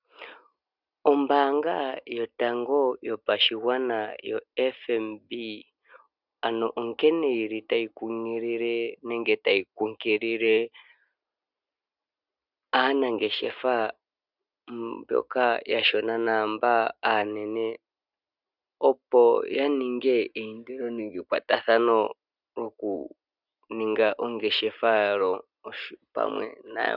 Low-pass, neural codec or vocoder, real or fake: 5.4 kHz; none; real